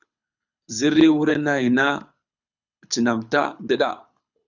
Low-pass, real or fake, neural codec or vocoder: 7.2 kHz; fake; codec, 24 kHz, 6 kbps, HILCodec